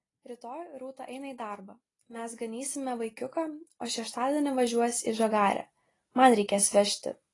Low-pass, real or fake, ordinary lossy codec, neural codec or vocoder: 10.8 kHz; real; AAC, 32 kbps; none